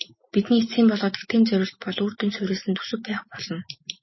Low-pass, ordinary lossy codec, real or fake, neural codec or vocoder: 7.2 kHz; MP3, 24 kbps; real; none